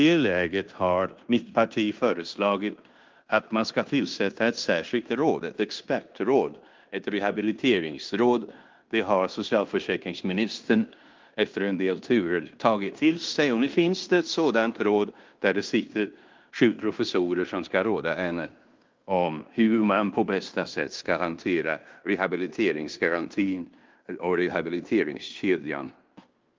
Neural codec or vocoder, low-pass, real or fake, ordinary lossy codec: codec, 16 kHz in and 24 kHz out, 0.9 kbps, LongCat-Audio-Codec, fine tuned four codebook decoder; 7.2 kHz; fake; Opus, 32 kbps